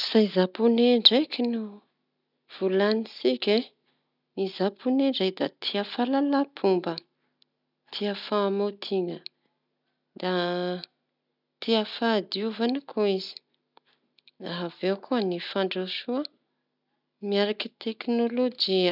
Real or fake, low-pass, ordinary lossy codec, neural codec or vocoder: real; 5.4 kHz; none; none